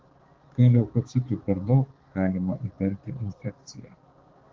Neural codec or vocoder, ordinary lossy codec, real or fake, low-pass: codec, 16 kHz, 4 kbps, X-Codec, HuBERT features, trained on balanced general audio; Opus, 16 kbps; fake; 7.2 kHz